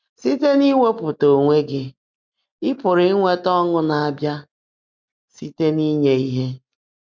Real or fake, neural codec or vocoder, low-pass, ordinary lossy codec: real; none; 7.2 kHz; MP3, 64 kbps